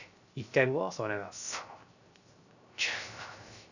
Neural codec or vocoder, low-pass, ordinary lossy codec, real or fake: codec, 16 kHz, 0.3 kbps, FocalCodec; 7.2 kHz; none; fake